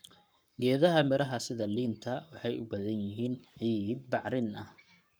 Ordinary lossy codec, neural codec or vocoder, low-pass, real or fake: none; codec, 44.1 kHz, 7.8 kbps, Pupu-Codec; none; fake